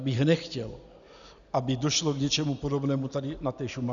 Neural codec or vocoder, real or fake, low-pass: none; real; 7.2 kHz